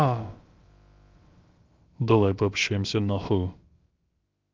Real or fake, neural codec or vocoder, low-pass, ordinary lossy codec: fake; codec, 16 kHz, about 1 kbps, DyCAST, with the encoder's durations; 7.2 kHz; Opus, 32 kbps